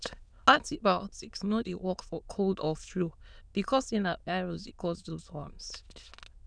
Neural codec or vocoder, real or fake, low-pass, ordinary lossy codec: autoencoder, 22.05 kHz, a latent of 192 numbers a frame, VITS, trained on many speakers; fake; 9.9 kHz; none